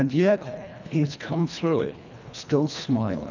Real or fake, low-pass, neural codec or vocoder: fake; 7.2 kHz; codec, 24 kHz, 1.5 kbps, HILCodec